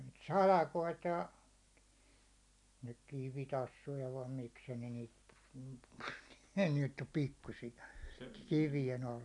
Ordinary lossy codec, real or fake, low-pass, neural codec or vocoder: none; real; 10.8 kHz; none